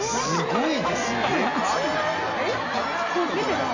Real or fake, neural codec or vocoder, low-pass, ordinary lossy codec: real; none; 7.2 kHz; AAC, 48 kbps